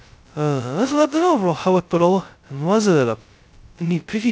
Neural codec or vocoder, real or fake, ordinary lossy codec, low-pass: codec, 16 kHz, 0.2 kbps, FocalCodec; fake; none; none